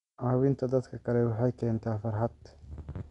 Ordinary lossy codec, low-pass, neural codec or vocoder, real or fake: none; 10.8 kHz; none; real